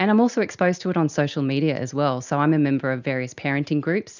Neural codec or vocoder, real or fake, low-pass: none; real; 7.2 kHz